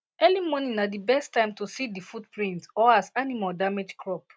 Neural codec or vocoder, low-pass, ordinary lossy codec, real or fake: none; none; none; real